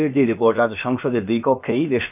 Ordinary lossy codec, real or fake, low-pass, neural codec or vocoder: none; fake; 3.6 kHz; codec, 16 kHz, about 1 kbps, DyCAST, with the encoder's durations